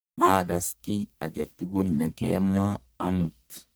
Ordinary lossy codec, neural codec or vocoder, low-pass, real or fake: none; codec, 44.1 kHz, 1.7 kbps, Pupu-Codec; none; fake